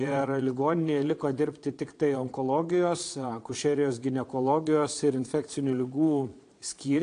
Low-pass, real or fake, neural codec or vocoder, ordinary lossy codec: 9.9 kHz; fake; vocoder, 22.05 kHz, 80 mel bands, Vocos; AAC, 48 kbps